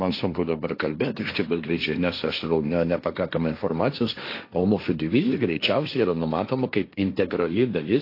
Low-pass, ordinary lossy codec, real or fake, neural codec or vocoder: 5.4 kHz; AAC, 32 kbps; fake; codec, 16 kHz, 1.1 kbps, Voila-Tokenizer